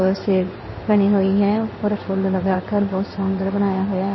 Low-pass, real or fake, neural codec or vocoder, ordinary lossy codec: 7.2 kHz; fake; codec, 16 kHz in and 24 kHz out, 1 kbps, XY-Tokenizer; MP3, 24 kbps